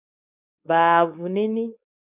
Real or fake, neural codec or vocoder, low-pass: fake; codec, 16 kHz, 4.8 kbps, FACodec; 3.6 kHz